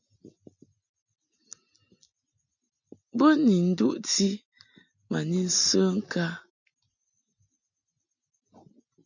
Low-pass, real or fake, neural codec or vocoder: 7.2 kHz; real; none